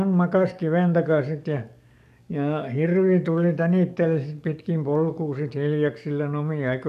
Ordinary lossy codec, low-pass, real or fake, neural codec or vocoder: none; 14.4 kHz; fake; codec, 44.1 kHz, 7.8 kbps, DAC